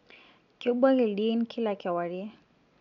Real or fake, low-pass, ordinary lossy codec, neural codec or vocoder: real; 7.2 kHz; none; none